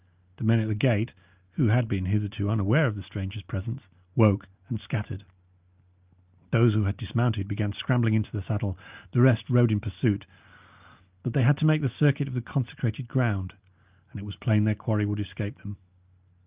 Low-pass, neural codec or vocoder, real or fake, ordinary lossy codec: 3.6 kHz; none; real; Opus, 24 kbps